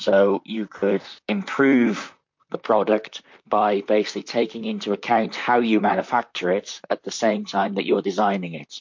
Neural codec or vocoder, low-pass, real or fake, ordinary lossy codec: vocoder, 44.1 kHz, 128 mel bands, Pupu-Vocoder; 7.2 kHz; fake; MP3, 48 kbps